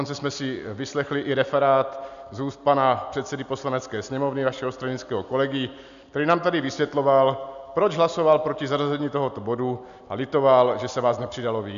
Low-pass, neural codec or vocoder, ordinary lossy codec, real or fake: 7.2 kHz; none; AAC, 96 kbps; real